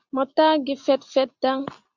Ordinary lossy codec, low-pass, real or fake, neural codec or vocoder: Opus, 64 kbps; 7.2 kHz; real; none